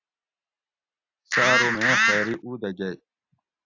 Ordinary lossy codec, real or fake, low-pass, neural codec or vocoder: AAC, 48 kbps; real; 7.2 kHz; none